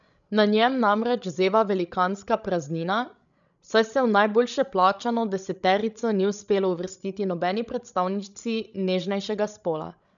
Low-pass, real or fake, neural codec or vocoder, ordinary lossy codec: 7.2 kHz; fake; codec, 16 kHz, 16 kbps, FreqCodec, larger model; none